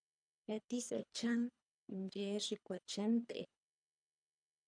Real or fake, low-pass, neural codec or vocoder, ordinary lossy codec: fake; 9.9 kHz; codec, 24 kHz, 3 kbps, HILCodec; MP3, 96 kbps